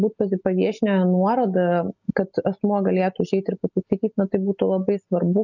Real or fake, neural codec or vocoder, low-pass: real; none; 7.2 kHz